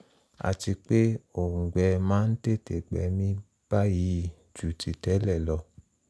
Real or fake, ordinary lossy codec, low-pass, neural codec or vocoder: real; none; none; none